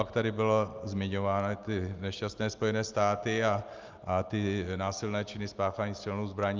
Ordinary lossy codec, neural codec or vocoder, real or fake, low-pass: Opus, 24 kbps; none; real; 7.2 kHz